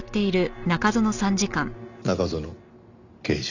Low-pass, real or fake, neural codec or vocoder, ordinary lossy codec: 7.2 kHz; real; none; none